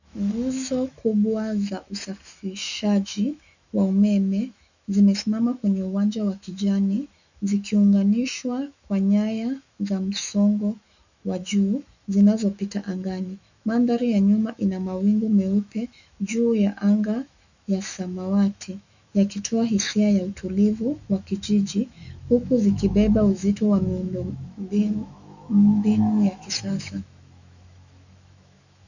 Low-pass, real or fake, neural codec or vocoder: 7.2 kHz; fake; autoencoder, 48 kHz, 128 numbers a frame, DAC-VAE, trained on Japanese speech